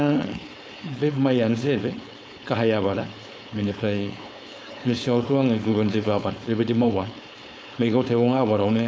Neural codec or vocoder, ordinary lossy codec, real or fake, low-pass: codec, 16 kHz, 4.8 kbps, FACodec; none; fake; none